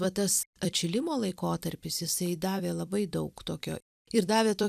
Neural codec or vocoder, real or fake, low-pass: vocoder, 44.1 kHz, 128 mel bands every 256 samples, BigVGAN v2; fake; 14.4 kHz